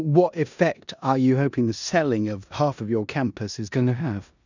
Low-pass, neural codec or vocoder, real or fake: 7.2 kHz; codec, 16 kHz in and 24 kHz out, 0.9 kbps, LongCat-Audio-Codec, four codebook decoder; fake